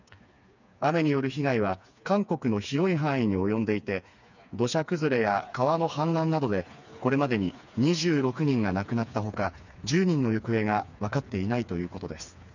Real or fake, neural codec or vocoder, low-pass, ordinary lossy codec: fake; codec, 16 kHz, 4 kbps, FreqCodec, smaller model; 7.2 kHz; none